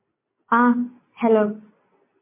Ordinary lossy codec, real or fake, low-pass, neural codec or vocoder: MP3, 24 kbps; real; 3.6 kHz; none